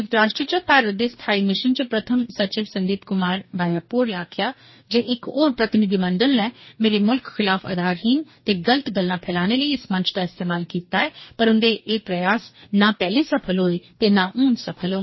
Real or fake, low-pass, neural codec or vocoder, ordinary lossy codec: fake; 7.2 kHz; codec, 44.1 kHz, 2.6 kbps, DAC; MP3, 24 kbps